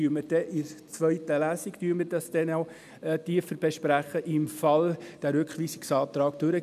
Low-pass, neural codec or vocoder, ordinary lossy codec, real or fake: 14.4 kHz; none; none; real